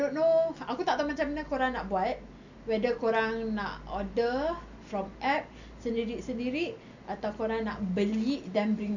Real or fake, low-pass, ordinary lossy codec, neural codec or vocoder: real; 7.2 kHz; none; none